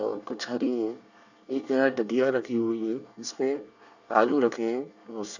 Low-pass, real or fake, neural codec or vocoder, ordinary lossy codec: 7.2 kHz; fake; codec, 24 kHz, 1 kbps, SNAC; none